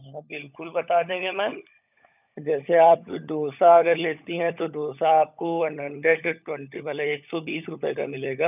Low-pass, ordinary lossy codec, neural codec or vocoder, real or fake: 3.6 kHz; none; codec, 16 kHz, 16 kbps, FunCodec, trained on LibriTTS, 50 frames a second; fake